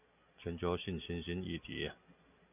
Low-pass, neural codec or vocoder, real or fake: 3.6 kHz; none; real